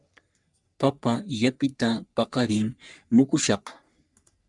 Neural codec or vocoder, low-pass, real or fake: codec, 44.1 kHz, 3.4 kbps, Pupu-Codec; 10.8 kHz; fake